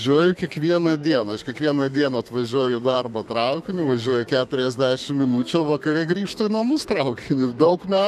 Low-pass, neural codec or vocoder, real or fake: 14.4 kHz; codec, 32 kHz, 1.9 kbps, SNAC; fake